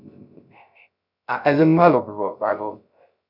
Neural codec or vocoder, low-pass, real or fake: codec, 16 kHz, 0.3 kbps, FocalCodec; 5.4 kHz; fake